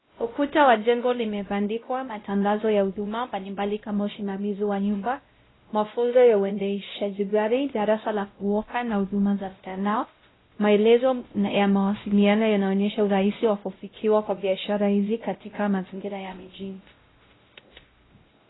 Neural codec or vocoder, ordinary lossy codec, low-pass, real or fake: codec, 16 kHz, 0.5 kbps, X-Codec, WavLM features, trained on Multilingual LibriSpeech; AAC, 16 kbps; 7.2 kHz; fake